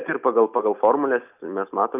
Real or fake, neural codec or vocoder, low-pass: real; none; 3.6 kHz